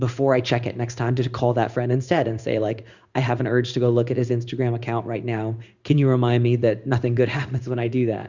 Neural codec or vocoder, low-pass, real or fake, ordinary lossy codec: codec, 16 kHz in and 24 kHz out, 1 kbps, XY-Tokenizer; 7.2 kHz; fake; Opus, 64 kbps